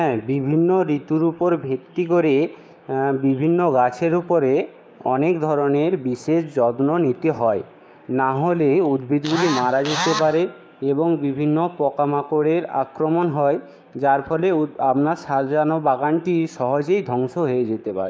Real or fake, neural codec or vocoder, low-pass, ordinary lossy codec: fake; codec, 16 kHz, 6 kbps, DAC; none; none